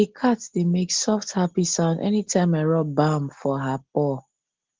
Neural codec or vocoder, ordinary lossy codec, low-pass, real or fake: none; Opus, 16 kbps; 7.2 kHz; real